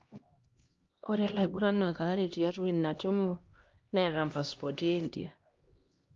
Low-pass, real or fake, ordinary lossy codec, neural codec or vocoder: 7.2 kHz; fake; Opus, 32 kbps; codec, 16 kHz, 1 kbps, X-Codec, HuBERT features, trained on LibriSpeech